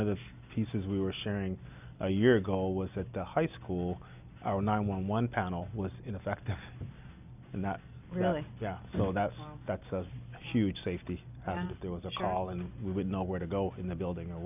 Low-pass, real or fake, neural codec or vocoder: 3.6 kHz; real; none